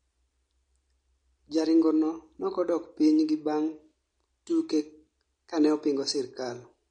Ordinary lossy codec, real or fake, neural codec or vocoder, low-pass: MP3, 48 kbps; real; none; 9.9 kHz